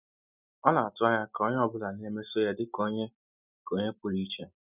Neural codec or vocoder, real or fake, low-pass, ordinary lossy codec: none; real; 3.6 kHz; none